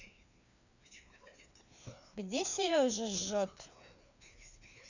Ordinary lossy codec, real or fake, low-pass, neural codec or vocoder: none; fake; 7.2 kHz; codec, 16 kHz, 2 kbps, FreqCodec, larger model